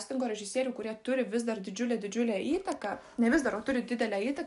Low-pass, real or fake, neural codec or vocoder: 10.8 kHz; real; none